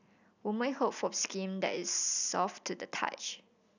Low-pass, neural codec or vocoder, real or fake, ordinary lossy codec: 7.2 kHz; none; real; none